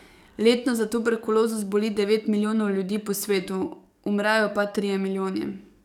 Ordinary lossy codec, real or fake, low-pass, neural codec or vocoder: none; fake; 19.8 kHz; codec, 44.1 kHz, 7.8 kbps, DAC